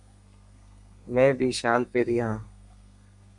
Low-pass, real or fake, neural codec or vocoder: 10.8 kHz; fake; codec, 32 kHz, 1.9 kbps, SNAC